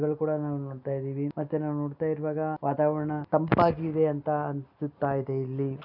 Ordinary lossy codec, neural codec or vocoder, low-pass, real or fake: none; none; 5.4 kHz; real